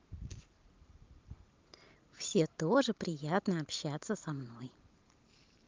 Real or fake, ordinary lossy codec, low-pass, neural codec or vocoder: fake; Opus, 32 kbps; 7.2 kHz; vocoder, 44.1 kHz, 128 mel bands every 512 samples, BigVGAN v2